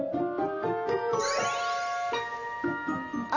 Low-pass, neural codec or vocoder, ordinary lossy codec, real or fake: 7.2 kHz; none; none; real